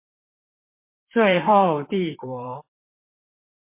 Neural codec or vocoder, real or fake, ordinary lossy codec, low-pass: vocoder, 44.1 kHz, 128 mel bands, Pupu-Vocoder; fake; MP3, 24 kbps; 3.6 kHz